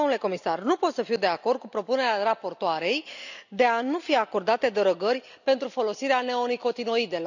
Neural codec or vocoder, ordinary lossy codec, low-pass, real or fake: none; none; 7.2 kHz; real